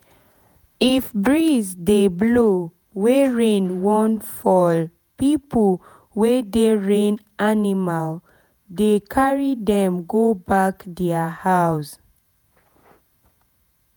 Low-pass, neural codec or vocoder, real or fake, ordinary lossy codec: none; vocoder, 48 kHz, 128 mel bands, Vocos; fake; none